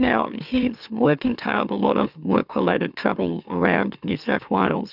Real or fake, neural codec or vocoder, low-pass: fake; autoencoder, 44.1 kHz, a latent of 192 numbers a frame, MeloTTS; 5.4 kHz